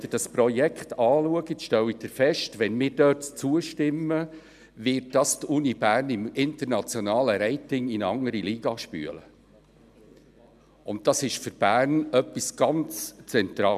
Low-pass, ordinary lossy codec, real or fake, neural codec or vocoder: 14.4 kHz; none; real; none